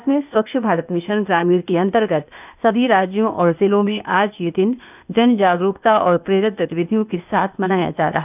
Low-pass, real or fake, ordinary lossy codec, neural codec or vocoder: 3.6 kHz; fake; none; codec, 16 kHz, 0.8 kbps, ZipCodec